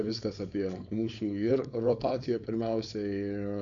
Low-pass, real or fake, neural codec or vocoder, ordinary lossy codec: 7.2 kHz; fake; codec, 16 kHz, 4.8 kbps, FACodec; MP3, 64 kbps